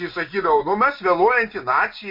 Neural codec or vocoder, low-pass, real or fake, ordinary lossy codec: none; 5.4 kHz; real; MP3, 32 kbps